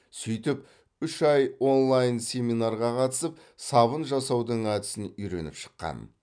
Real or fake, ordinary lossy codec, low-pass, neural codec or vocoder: real; none; 9.9 kHz; none